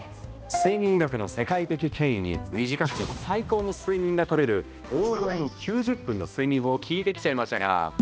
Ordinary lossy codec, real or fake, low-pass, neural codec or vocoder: none; fake; none; codec, 16 kHz, 1 kbps, X-Codec, HuBERT features, trained on balanced general audio